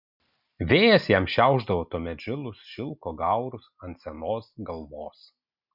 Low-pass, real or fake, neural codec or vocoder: 5.4 kHz; real; none